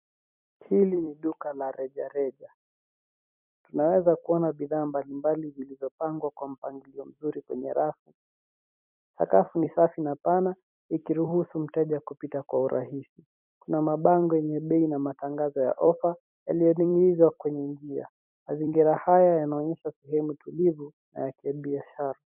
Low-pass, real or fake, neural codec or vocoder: 3.6 kHz; fake; vocoder, 44.1 kHz, 128 mel bands every 256 samples, BigVGAN v2